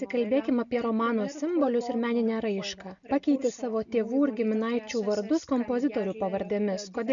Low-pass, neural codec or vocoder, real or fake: 7.2 kHz; none; real